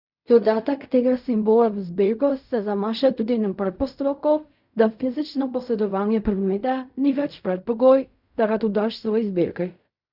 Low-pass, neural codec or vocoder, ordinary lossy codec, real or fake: 5.4 kHz; codec, 16 kHz in and 24 kHz out, 0.4 kbps, LongCat-Audio-Codec, fine tuned four codebook decoder; none; fake